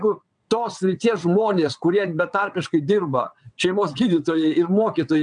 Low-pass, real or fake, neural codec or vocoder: 9.9 kHz; fake; vocoder, 22.05 kHz, 80 mel bands, WaveNeXt